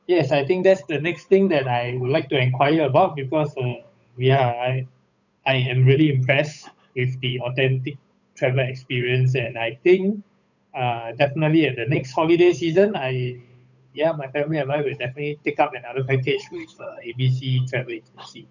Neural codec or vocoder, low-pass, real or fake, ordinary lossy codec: codec, 16 kHz, 8 kbps, FunCodec, trained on Chinese and English, 25 frames a second; 7.2 kHz; fake; none